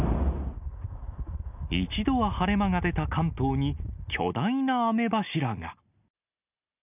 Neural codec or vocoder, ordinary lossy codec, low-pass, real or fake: none; none; 3.6 kHz; real